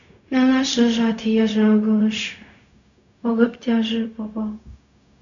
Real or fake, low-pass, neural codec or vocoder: fake; 7.2 kHz; codec, 16 kHz, 0.4 kbps, LongCat-Audio-Codec